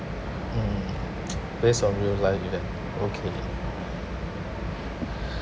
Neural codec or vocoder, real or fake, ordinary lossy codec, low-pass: none; real; none; none